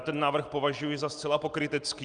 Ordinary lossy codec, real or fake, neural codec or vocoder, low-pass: Opus, 32 kbps; real; none; 9.9 kHz